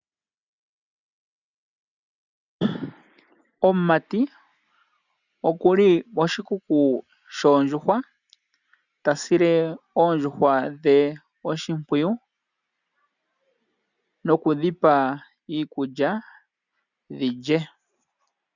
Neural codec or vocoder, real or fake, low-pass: none; real; 7.2 kHz